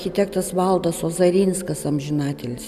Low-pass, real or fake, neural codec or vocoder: 14.4 kHz; real; none